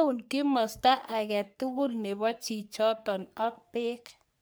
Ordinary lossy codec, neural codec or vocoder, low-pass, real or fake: none; codec, 44.1 kHz, 3.4 kbps, Pupu-Codec; none; fake